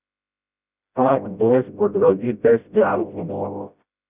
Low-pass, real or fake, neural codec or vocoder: 3.6 kHz; fake; codec, 16 kHz, 0.5 kbps, FreqCodec, smaller model